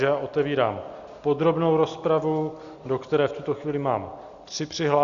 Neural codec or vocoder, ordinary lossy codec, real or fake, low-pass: none; Opus, 64 kbps; real; 7.2 kHz